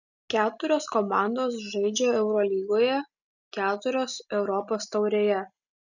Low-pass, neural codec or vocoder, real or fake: 7.2 kHz; none; real